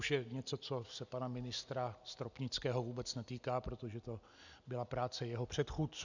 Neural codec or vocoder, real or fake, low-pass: none; real; 7.2 kHz